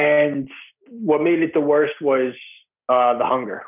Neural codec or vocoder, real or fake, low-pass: none; real; 3.6 kHz